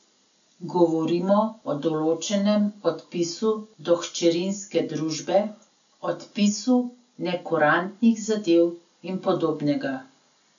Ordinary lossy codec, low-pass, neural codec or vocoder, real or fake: none; 7.2 kHz; none; real